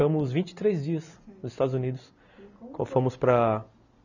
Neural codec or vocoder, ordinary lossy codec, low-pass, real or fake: none; none; 7.2 kHz; real